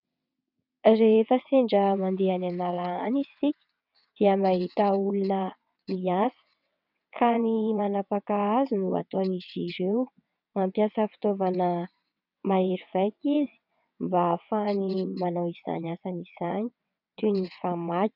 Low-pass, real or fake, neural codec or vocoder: 5.4 kHz; fake; vocoder, 22.05 kHz, 80 mel bands, WaveNeXt